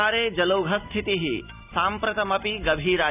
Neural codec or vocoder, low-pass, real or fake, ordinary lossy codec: none; 3.6 kHz; real; none